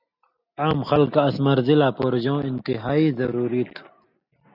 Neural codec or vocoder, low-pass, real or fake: none; 5.4 kHz; real